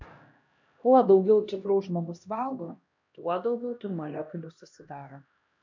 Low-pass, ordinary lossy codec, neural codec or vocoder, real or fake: 7.2 kHz; MP3, 64 kbps; codec, 16 kHz, 1 kbps, X-Codec, HuBERT features, trained on LibriSpeech; fake